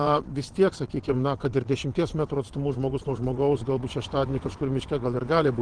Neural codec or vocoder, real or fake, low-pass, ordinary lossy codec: vocoder, 22.05 kHz, 80 mel bands, WaveNeXt; fake; 9.9 kHz; Opus, 16 kbps